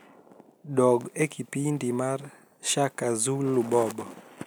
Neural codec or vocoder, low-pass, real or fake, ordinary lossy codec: none; none; real; none